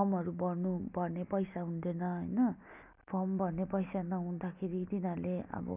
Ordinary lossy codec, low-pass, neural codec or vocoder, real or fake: none; 3.6 kHz; none; real